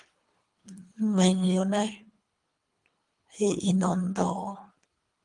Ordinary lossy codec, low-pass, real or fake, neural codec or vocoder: Opus, 32 kbps; 10.8 kHz; fake; codec, 24 kHz, 3 kbps, HILCodec